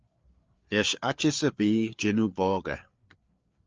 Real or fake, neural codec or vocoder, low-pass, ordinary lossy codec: fake; codec, 16 kHz, 4 kbps, FreqCodec, larger model; 7.2 kHz; Opus, 24 kbps